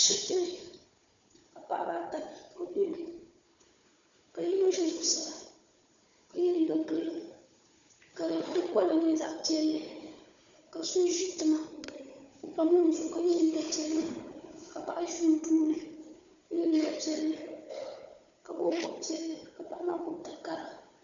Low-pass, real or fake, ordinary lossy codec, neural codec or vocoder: 7.2 kHz; fake; MP3, 96 kbps; codec, 16 kHz, 4 kbps, FunCodec, trained on Chinese and English, 50 frames a second